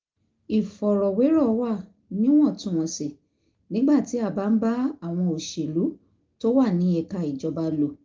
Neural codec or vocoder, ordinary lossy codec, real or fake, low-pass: none; Opus, 32 kbps; real; 7.2 kHz